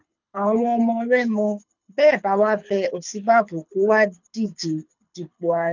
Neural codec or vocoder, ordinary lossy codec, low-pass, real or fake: codec, 24 kHz, 6 kbps, HILCodec; none; 7.2 kHz; fake